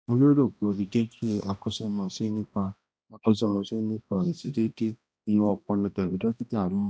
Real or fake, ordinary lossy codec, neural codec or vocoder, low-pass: fake; none; codec, 16 kHz, 1 kbps, X-Codec, HuBERT features, trained on balanced general audio; none